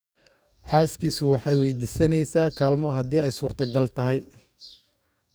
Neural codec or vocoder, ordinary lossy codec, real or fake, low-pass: codec, 44.1 kHz, 2.6 kbps, DAC; none; fake; none